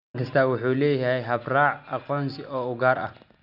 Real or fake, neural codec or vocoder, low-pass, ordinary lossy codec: real; none; 5.4 kHz; none